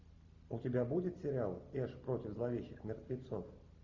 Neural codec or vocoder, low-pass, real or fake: none; 7.2 kHz; real